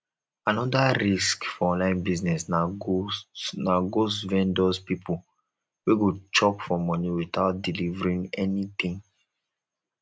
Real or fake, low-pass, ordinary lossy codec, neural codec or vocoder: real; none; none; none